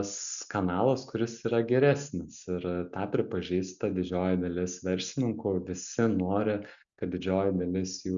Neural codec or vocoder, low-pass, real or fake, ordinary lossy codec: none; 7.2 kHz; real; MP3, 96 kbps